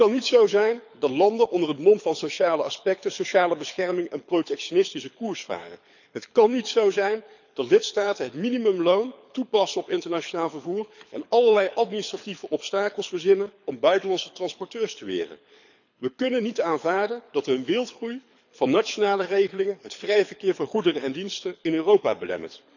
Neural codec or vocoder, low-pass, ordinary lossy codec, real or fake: codec, 24 kHz, 6 kbps, HILCodec; 7.2 kHz; none; fake